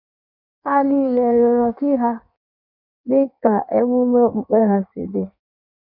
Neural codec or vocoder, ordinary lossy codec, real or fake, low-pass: codec, 16 kHz in and 24 kHz out, 1.1 kbps, FireRedTTS-2 codec; none; fake; 5.4 kHz